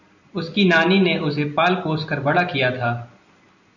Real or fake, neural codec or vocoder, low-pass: real; none; 7.2 kHz